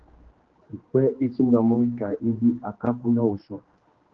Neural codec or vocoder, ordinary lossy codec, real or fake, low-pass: codec, 16 kHz, 2 kbps, X-Codec, HuBERT features, trained on general audio; Opus, 16 kbps; fake; 7.2 kHz